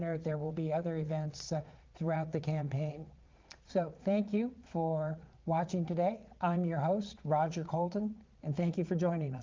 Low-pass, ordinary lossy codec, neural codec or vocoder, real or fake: 7.2 kHz; Opus, 32 kbps; vocoder, 22.05 kHz, 80 mel bands, Vocos; fake